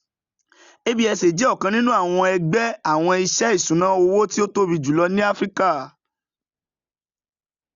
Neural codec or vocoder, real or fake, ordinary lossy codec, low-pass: none; real; Opus, 64 kbps; 7.2 kHz